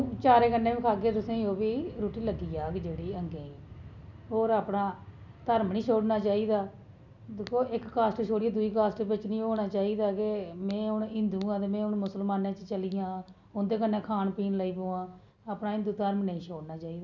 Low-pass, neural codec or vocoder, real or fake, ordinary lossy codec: 7.2 kHz; none; real; none